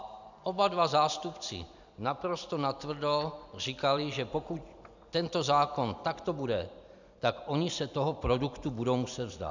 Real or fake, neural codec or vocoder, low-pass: fake; vocoder, 44.1 kHz, 128 mel bands every 256 samples, BigVGAN v2; 7.2 kHz